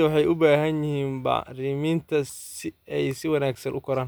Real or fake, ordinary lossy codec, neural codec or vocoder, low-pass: real; none; none; none